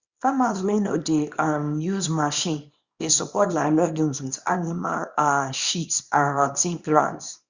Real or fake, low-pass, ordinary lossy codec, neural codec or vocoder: fake; 7.2 kHz; Opus, 64 kbps; codec, 24 kHz, 0.9 kbps, WavTokenizer, small release